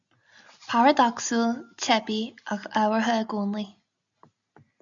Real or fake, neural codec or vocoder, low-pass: real; none; 7.2 kHz